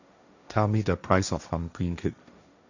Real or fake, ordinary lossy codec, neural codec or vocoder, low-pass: fake; none; codec, 16 kHz, 1.1 kbps, Voila-Tokenizer; 7.2 kHz